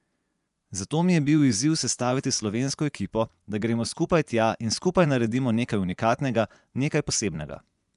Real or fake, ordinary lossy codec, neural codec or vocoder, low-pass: fake; none; vocoder, 24 kHz, 100 mel bands, Vocos; 10.8 kHz